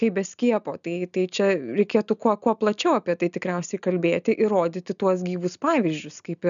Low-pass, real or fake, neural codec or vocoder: 7.2 kHz; real; none